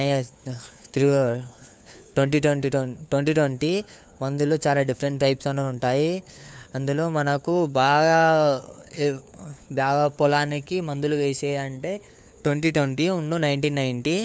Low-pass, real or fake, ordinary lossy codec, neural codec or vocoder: none; fake; none; codec, 16 kHz, 4 kbps, FunCodec, trained on LibriTTS, 50 frames a second